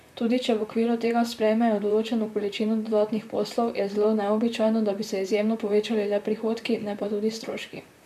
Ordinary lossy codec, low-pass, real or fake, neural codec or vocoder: none; 14.4 kHz; fake; vocoder, 44.1 kHz, 128 mel bands, Pupu-Vocoder